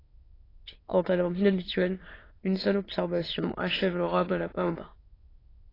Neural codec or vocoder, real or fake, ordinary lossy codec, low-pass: autoencoder, 22.05 kHz, a latent of 192 numbers a frame, VITS, trained on many speakers; fake; AAC, 24 kbps; 5.4 kHz